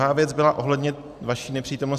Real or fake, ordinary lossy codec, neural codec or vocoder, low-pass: fake; AAC, 96 kbps; vocoder, 44.1 kHz, 128 mel bands every 256 samples, BigVGAN v2; 14.4 kHz